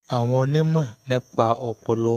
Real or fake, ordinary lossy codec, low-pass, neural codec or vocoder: fake; none; 14.4 kHz; codec, 32 kHz, 1.9 kbps, SNAC